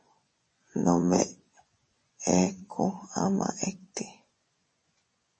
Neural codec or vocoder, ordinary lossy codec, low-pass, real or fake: vocoder, 24 kHz, 100 mel bands, Vocos; MP3, 32 kbps; 10.8 kHz; fake